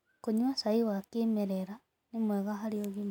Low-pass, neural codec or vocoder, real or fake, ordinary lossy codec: 19.8 kHz; none; real; none